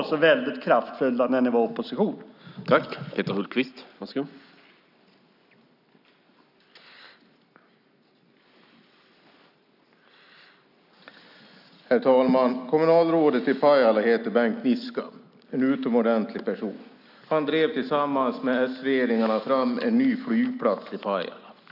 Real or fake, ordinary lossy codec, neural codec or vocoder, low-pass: real; none; none; 5.4 kHz